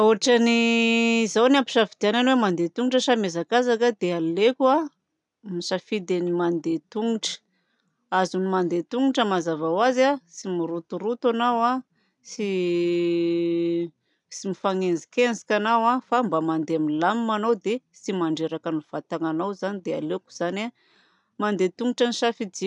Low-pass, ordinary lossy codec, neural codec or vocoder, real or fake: 10.8 kHz; none; none; real